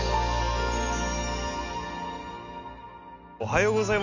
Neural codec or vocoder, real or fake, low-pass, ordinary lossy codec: none; real; 7.2 kHz; none